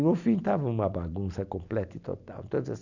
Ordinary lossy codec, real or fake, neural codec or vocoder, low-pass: none; real; none; 7.2 kHz